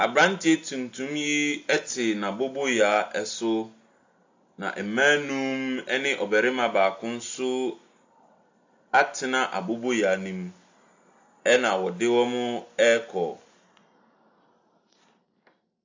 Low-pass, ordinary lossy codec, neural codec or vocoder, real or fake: 7.2 kHz; AAC, 48 kbps; none; real